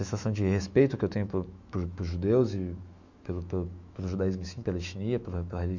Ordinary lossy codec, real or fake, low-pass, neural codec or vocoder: Opus, 64 kbps; fake; 7.2 kHz; autoencoder, 48 kHz, 128 numbers a frame, DAC-VAE, trained on Japanese speech